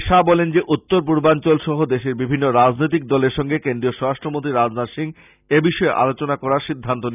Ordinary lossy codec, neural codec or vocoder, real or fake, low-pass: none; none; real; 3.6 kHz